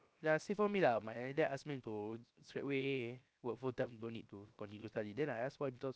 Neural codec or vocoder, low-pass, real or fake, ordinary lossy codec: codec, 16 kHz, 0.7 kbps, FocalCodec; none; fake; none